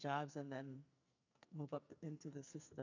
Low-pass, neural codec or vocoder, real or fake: 7.2 kHz; codec, 44.1 kHz, 2.6 kbps, SNAC; fake